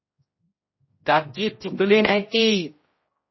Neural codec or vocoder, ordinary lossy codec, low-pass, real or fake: codec, 16 kHz, 0.5 kbps, X-Codec, HuBERT features, trained on general audio; MP3, 24 kbps; 7.2 kHz; fake